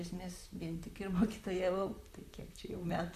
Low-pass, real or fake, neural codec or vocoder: 14.4 kHz; fake; vocoder, 44.1 kHz, 128 mel bands, Pupu-Vocoder